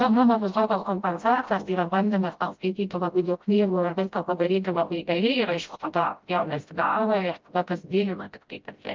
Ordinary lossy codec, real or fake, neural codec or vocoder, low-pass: Opus, 32 kbps; fake; codec, 16 kHz, 0.5 kbps, FreqCodec, smaller model; 7.2 kHz